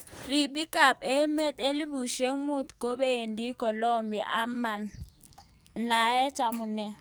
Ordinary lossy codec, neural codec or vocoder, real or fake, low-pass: none; codec, 44.1 kHz, 2.6 kbps, SNAC; fake; none